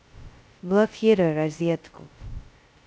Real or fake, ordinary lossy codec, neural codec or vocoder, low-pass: fake; none; codec, 16 kHz, 0.2 kbps, FocalCodec; none